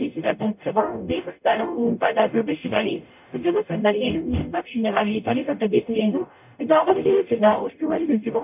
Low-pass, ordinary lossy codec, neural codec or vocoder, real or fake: 3.6 kHz; none; codec, 44.1 kHz, 0.9 kbps, DAC; fake